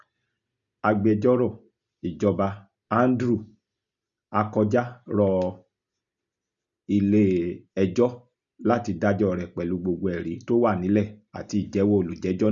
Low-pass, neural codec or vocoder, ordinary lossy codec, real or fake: 7.2 kHz; none; none; real